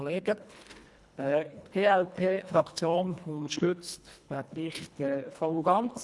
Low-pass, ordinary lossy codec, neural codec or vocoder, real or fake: none; none; codec, 24 kHz, 1.5 kbps, HILCodec; fake